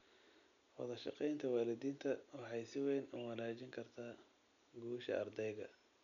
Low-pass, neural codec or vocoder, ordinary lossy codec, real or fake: 7.2 kHz; none; none; real